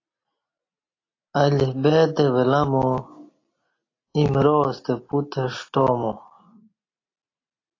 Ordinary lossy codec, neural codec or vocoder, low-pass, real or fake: AAC, 32 kbps; none; 7.2 kHz; real